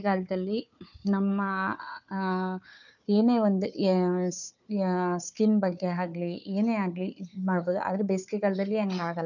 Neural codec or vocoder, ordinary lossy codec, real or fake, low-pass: codec, 16 kHz, 4 kbps, FunCodec, trained on Chinese and English, 50 frames a second; none; fake; 7.2 kHz